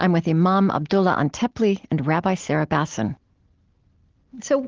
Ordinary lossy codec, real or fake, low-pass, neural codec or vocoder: Opus, 16 kbps; real; 7.2 kHz; none